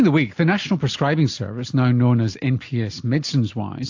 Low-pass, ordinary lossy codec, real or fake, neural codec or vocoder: 7.2 kHz; AAC, 48 kbps; real; none